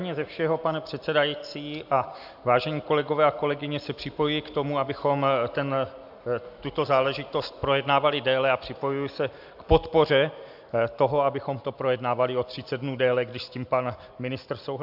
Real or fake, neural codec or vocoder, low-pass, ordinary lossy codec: real; none; 5.4 kHz; AAC, 48 kbps